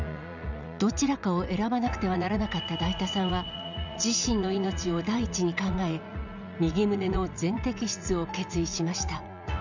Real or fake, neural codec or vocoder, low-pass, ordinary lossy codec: fake; vocoder, 44.1 kHz, 80 mel bands, Vocos; 7.2 kHz; none